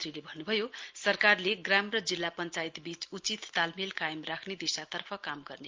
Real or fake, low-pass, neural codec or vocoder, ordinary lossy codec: real; 7.2 kHz; none; Opus, 32 kbps